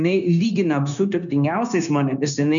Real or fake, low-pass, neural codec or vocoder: fake; 7.2 kHz; codec, 16 kHz, 0.9 kbps, LongCat-Audio-Codec